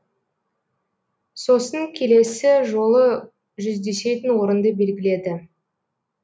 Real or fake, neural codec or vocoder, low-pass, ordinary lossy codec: real; none; none; none